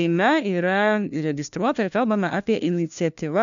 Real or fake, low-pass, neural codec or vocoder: fake; 7.2 kHz; codec, 16 kHz, 1 kbps, FunCodec, trained on LibriTTS, 50 frames a second